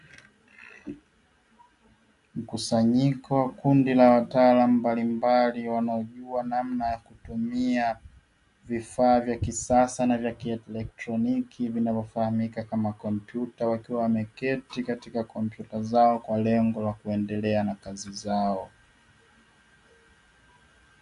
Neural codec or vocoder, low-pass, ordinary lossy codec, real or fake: none; 10.8 kHz; MP3, 64 kbps; real